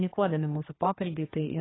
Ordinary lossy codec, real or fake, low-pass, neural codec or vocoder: AAC, 16 kbps; fake; 7.2 kHz; codec, 16 kHz, 2 kbps, X-Codec, HuBERT features, trained on general audio